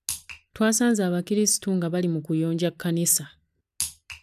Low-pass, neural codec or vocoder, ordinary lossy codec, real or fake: 14.4 kHz; none; none; real